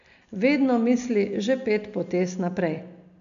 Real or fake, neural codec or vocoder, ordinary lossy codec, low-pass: real; none; none; 7.2 kHz